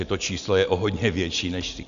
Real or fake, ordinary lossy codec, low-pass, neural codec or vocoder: real; AAC, 96 kbps; 7.2 kHz; none